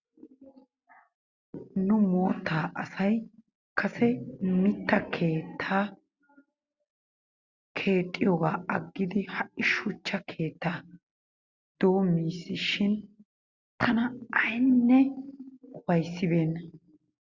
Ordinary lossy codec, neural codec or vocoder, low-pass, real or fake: Opus, 64 kbps; none; 7.2 kHz; real